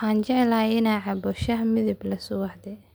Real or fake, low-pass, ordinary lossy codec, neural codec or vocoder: real; none; none; none